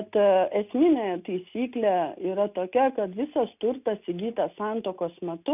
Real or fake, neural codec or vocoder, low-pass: real; none; 3.6 kHz